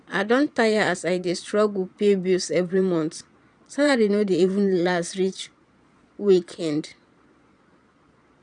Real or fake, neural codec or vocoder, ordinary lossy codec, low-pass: fake; vocoder, 22.05 kHz, 80 mel bands, Vocos; none; 9.9 kHz